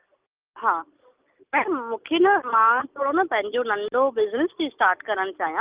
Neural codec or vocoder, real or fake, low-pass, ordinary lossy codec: none; real; 3.6 kHz; Opus, 32 kbps